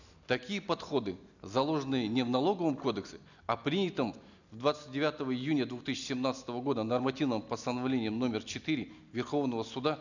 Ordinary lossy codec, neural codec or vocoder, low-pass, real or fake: none; none; 7.2 kHz; real